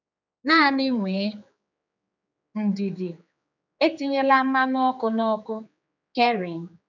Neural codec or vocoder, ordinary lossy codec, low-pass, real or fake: codec, 16 kHz, 4 kbps, X-Codec, HuBERT features, trained on general audio; none; 7.2 kHz; fake